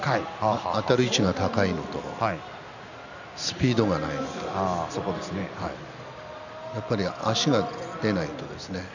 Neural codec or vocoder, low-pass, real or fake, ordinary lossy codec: none; 7.2 kHz; real; none